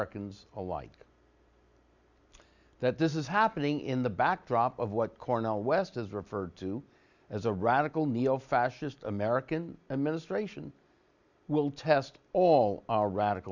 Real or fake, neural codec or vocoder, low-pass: real; none; 7.2 kHz